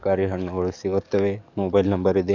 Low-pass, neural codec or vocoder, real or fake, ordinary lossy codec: 7.2 kHz; codec, 44.1 kHz, 7.8 kbps, DAC; fake; none